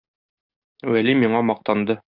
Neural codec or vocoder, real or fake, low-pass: none; real; 5.4 kHz